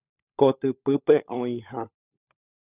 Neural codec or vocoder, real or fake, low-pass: codec, 16 kHz, 16 kbps, FunCodec, trained on LibriTTS, 50 frames a second; fake; 3.6 kHz